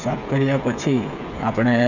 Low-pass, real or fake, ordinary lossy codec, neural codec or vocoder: 7.2 kHz; fake; none; codec, 16 kHz, 8 kbps, FreqCodec, smaller model